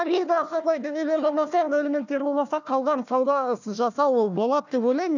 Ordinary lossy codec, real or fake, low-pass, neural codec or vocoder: none; fake; 7.2 kHz; codec, 16 kHz, 1 kbps, FunCodec, trained on Chinese and English, 50 frames a second